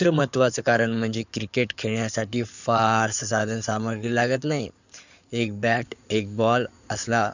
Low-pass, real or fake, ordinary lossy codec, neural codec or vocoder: 7.2 kHz; fake; none; codec, 16 kHz in and 24 kHz out, 2.2 kbps, FireRedTTS-2 codec